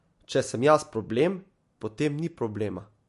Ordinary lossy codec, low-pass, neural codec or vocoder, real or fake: MP3, 48 kbps; 14.4 kHz; none; real